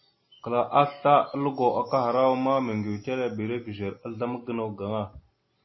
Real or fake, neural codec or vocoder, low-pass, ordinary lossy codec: real; none; 7.2 kHz; MP3, 24 kbps